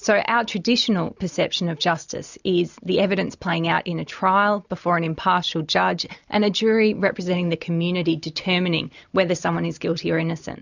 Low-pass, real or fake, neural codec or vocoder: 7.2 kHz; real; none